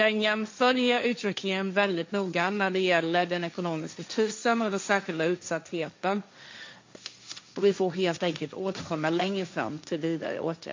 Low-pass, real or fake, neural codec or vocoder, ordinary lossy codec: 7.2 kHz; fake; codec, 16 kHz, 1.1 kbps, Voila-Tokenizer; MP3, 48 kbps